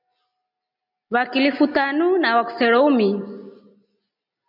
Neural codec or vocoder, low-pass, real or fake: none; 5.4 kHz; real